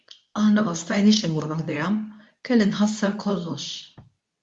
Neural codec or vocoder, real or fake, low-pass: codec, 24 kHz, 0.9 kbps, WavTokenizer, medium speech release version 2; fake; 10.8 kHz